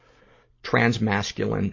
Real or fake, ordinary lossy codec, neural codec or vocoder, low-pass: real; MP3, 32 kbps; none; 7.2 kHz